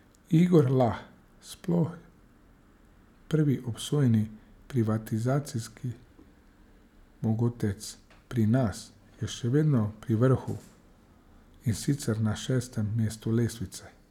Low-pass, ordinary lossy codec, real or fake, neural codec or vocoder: 19.8 kHz; none; real; none